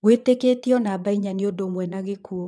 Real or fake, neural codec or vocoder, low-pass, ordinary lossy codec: fake; vocoder, 22.05 kHz, 80 mel bands, Vocos; none; none